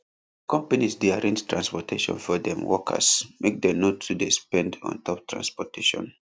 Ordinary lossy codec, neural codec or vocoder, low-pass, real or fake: none; none; none; real